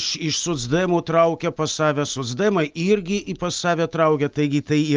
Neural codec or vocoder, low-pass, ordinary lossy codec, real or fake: none; 7.2 kHz; Opus, 32 kbps; real